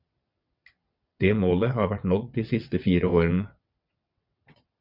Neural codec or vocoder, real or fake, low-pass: vocoder, 22.05 kHz, 80 mel bands, WaveNeXt; fake; 5.4 kHz